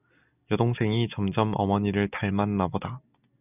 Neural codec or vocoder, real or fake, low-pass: none; real; 3.6 kHz